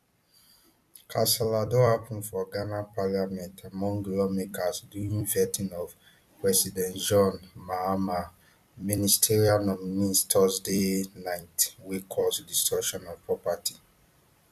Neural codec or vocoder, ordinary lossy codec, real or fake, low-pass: vocoder, 44.1 kHz, 128 mel bands every 256 samples, BigVGAN v2; none; fake; 14.4 kHz